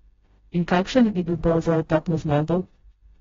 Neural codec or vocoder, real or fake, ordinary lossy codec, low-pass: codec, 16 kHz, 0.5 kbps, FreqCodec, smaller model; fake; AAC, 24 kbps; 7.2 kHz